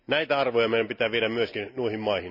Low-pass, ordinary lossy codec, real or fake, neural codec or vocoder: 5.4 kHz; none; real; none